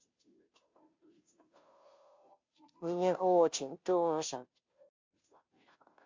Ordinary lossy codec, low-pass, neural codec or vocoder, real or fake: MP3, 48 kbps; 7.2 kHz; codec, 16 kHz, 0.5 kbps, FunCodec, trained on Chinese and English, 25 frames a second; fake